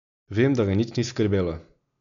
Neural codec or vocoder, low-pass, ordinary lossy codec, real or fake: none; 7.2 kHz; none; real